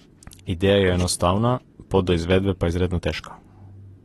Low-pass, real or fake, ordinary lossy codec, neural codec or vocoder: 19.8 kHz; real; AAC, 32 kbps; none